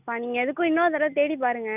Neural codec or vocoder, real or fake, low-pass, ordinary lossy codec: none; real; 3.6 kHz; none